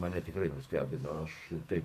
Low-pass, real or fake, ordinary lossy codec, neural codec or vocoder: 14.4 kHz; fake; MP3, 96 kbps; codec, 44.1 kHz, 2.6 kbps, SNAC